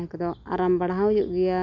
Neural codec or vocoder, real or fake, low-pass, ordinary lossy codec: none; real; 7.2 kHz; none